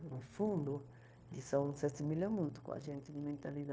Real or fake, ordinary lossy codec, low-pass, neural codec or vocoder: fake; none; none; codec, 16 kHz, 0.9 kbps, LongCat-Audio-Codec